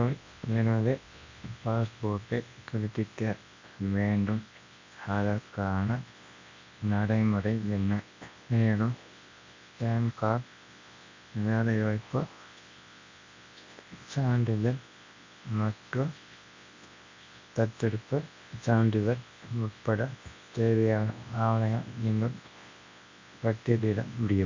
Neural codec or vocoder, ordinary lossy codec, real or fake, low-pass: codec, 24 kHz, 0.9 kbps, WavTokenizer, large speech release; MP3, 48 kbps; fake; 7.2 kHz